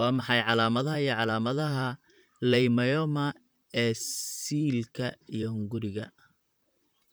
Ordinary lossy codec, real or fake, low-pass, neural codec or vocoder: none; fake; none; vocoder, 44.1 kHz, 128 mel bands, Pupu-Vocoder